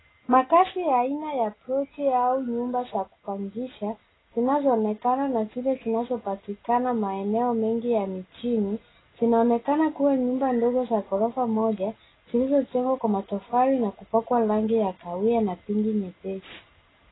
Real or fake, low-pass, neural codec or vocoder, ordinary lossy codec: real; 7.2 kHz; none; AAC, 16 kbps